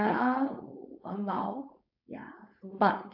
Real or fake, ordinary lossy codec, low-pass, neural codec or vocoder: fake; AAC, 48 kbps; 5.4 kHz; codec, 16 kHz, 4.8 kbps, FACodec